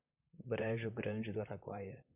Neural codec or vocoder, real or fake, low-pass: vocoder, 44.1 kHz, 128 mel bands, Pupu-Vocoder; fake; 3.6 kHz